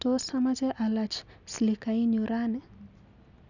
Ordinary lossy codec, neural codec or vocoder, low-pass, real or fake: none; none; 7.2 kHz; real